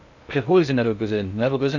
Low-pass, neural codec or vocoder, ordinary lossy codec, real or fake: 7.2 kHz; codec, 16 kHz in and 24 kHz out, 0.6 kbps, FocalCodec, streaming, 2048 codes; none; fake